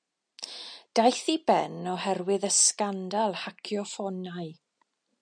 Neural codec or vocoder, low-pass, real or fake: none; 9.9 kHz; real